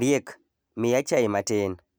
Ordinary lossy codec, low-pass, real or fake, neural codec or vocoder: none; none; real; none